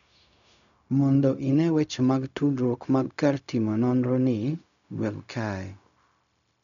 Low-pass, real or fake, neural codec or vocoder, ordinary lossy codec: 7.2 kHz; fake; codec, 16 kHz, 0.4 kbps, LongCat-Audio-Codec; none